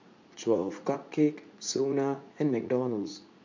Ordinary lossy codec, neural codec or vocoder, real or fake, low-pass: MP3, 64 kbps; vocoder, 44.1 kHz, 80 mel bands, Vocos; fake; 7.2 kHz